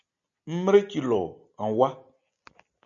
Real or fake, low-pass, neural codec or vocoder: real; 7.2 kHz; none